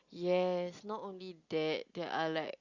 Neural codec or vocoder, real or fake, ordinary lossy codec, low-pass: none; real; Opus, 64 kbps; 7.2 kHz